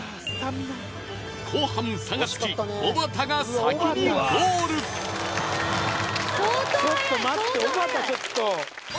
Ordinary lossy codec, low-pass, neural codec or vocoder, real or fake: none; none; none; real